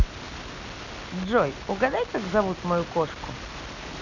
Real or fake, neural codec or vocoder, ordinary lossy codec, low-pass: real; none; none; 7.2 kHz